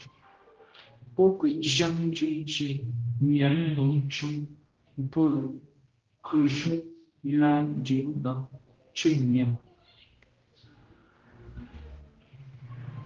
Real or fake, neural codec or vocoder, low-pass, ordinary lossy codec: fake; codec, 16 kHz, 0.5 kbps, X-Codec, HuBERT features, trained on general audio; 7.2 kHz; Opus, 16 kbps